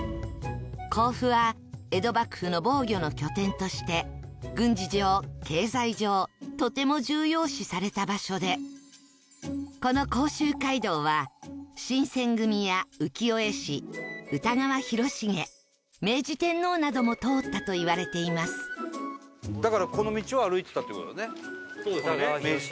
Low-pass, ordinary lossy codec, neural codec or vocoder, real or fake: none; none; none; real